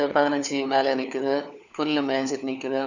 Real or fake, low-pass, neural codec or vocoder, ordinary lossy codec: fake; 7.2 kHz; codec, 16 kHz, 4 kbps, FunCodec, trained on LibriTTS, 50 frames a second; none